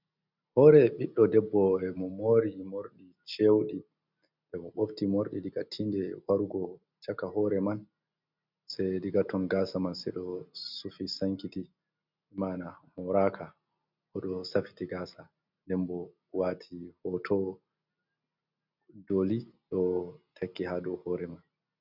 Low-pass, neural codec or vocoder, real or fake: 5.4 kHz; none; real